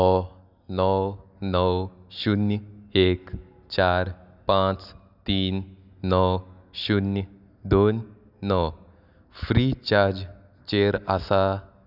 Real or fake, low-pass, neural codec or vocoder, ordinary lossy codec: real; 5.4 kHz; none; none